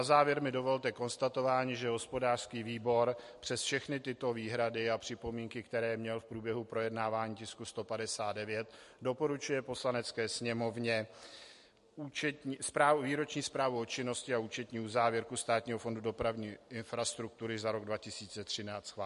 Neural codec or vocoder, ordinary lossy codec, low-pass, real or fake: none; MP3, 48 kbps; 14.4 kHz; real